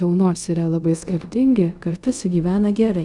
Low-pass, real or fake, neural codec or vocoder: 10.8 kHz; fake; codec, 24 kHz, 0.5 kbps, DualCodec